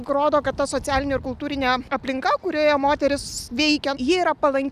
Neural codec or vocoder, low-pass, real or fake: none; 14.4 kHz; real